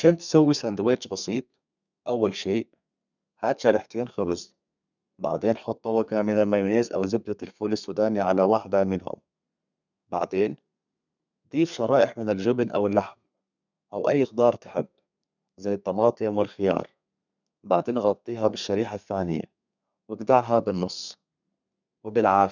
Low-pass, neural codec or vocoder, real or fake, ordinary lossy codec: 7.2 kHz; codec, 32 kHz, 1.9 kbps, SNAC; fake; none